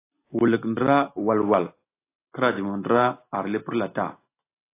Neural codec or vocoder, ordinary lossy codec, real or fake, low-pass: none; AAC, 24 kbps; real; 3.6 kHz